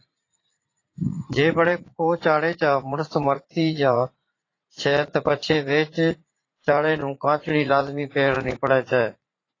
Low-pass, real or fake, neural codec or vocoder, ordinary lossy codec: 7.2 kHz; fake; vocoder, 44.1 kHz, 80 mel bands, Vocos; AAC, 32 kbps